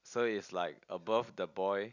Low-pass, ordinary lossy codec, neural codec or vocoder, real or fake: 7.2 kHz; none; none; real